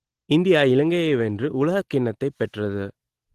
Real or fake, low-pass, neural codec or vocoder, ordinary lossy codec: real; 14.4 kHz; none; Opus, 16 kbps